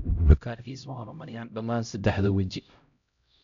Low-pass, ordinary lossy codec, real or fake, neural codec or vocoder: 7.2 kHz; none; fake; codec, 16 kHz, 0.5 kbps, X-Codec, HuBERT features, trained on LibriSpeech